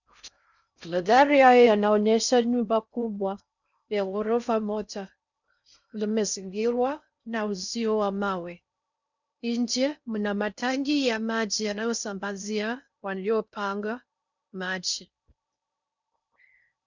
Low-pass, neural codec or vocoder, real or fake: 7.2 kHz; codec, 16 kHz in and 24 kHz out, 0.6 kbps, FocalCodec, streaming, 4096 codes; fake